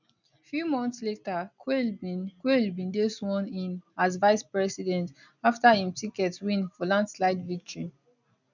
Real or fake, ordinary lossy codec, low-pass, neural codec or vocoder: real; none; 7.2 kHz; none